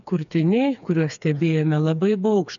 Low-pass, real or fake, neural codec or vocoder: 7.2 kHz; fake; codec, 16 kHz, 4 kbps, FreqCodec, smaller model